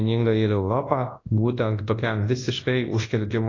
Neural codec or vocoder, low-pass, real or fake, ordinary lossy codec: codec, 24 kHz, 0.9 kbps, WavTokenizer, large speech release; 7.2 kHz; fake; AAC, 32 kbps